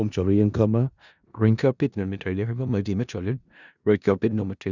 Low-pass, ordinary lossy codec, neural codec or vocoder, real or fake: 7.2 kHz; none; codec, 16 kHz in and 24 kHz out, 0.4 kbps, LongCat-Audio-Codec, four codebook decoder; fake